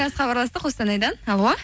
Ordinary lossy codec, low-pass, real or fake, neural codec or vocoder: none; none; real; none